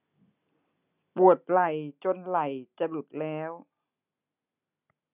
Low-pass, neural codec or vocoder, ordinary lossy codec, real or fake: 3.6 kHz; none; none; real